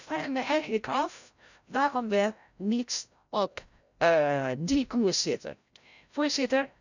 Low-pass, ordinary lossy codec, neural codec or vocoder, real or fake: 7.2 kHz; none; codec, 16 kHz, 0.5 kbps, FreqCodec, larger model; fake